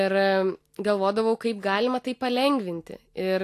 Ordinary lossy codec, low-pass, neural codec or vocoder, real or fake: AAC, 64 kbps; 14.4 kHz; none; real